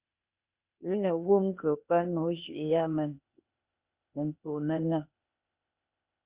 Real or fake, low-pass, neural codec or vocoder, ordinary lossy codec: fake; 3.6 kHz; codec, 16 kHz, 0.8 kbps, ZipCodec; Opus, 64 kbps